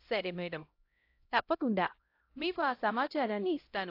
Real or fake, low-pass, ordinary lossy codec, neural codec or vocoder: fake; 5.4 kHz; AAC, 32 kbps; codec, 16 kHz, 0.5 kbps, X-Codec, HuBERT features, trained on LibriSpeech